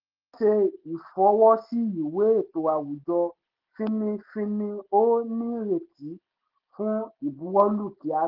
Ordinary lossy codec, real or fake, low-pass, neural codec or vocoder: Opus, 16 kbps; real; 5.4 kHz; none